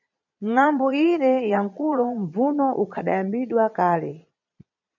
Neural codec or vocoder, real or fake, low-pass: vocoder, 22.05 kHz, 80 mel bands, Vocos; fake; 7.2 kHz